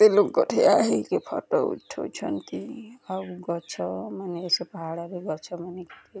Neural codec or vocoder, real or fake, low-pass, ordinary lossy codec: none; real; none; none